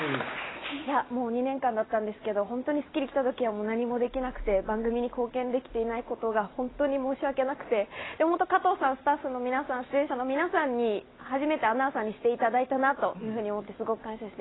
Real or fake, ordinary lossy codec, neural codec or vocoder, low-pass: real; AAC, 16 kbps; none; 7.2 kHz